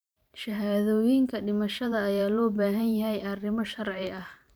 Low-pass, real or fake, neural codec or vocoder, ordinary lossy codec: none; fake; vocoder, 44.1 kHz, 128 mel bands every 512 samples, BigVGAN v2; none